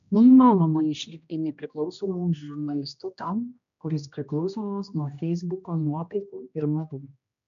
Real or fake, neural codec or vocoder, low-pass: fake; codec, 16 kHz, 1 kbps, X-Codec, HuBERT features, trained on general audio; 7.2 kHz